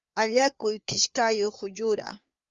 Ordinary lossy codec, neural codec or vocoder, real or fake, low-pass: Opus, 32 kbps; codec, 16 kHz, 4 kbps, FreqCodec, larger model; fake; 7.2 kHz